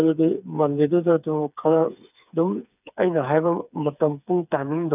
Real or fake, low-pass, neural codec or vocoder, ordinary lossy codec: fake; 3.6 kHz; codec, 16 kHz, 4 kbps, FreqCodec, smaller model; none